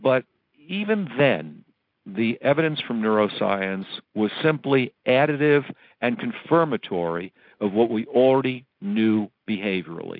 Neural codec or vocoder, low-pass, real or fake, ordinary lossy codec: none; 5.4 kHz; real; MP3, 48 kbps